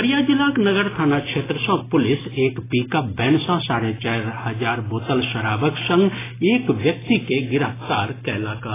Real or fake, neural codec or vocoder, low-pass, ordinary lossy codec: real; none; 3.6 kHz; AAC, 16 kbps